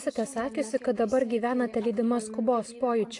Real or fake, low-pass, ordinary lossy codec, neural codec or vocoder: real; 10.8 kHz; AAC, 48 kbps; none